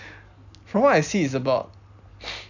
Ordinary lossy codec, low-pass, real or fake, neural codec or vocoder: none; 7.2 kHz; real; none